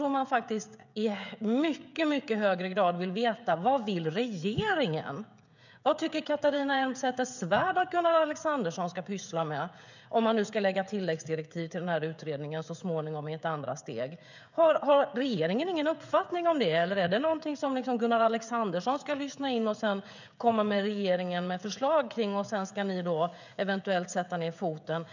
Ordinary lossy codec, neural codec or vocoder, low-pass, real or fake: none; codec, 16 kHz, 16 kbps, FreqCodec, smaller model; 7.2 kHz; fake